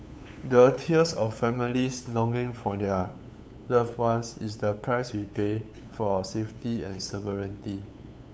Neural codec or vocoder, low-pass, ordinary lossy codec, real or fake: codec, 16 kHz, 8 kbps, FunCodec, trained on LibriTTS, 25 frames a second; none; none; fake